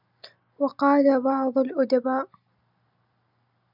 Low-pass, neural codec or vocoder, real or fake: 5.4 kHz; none; real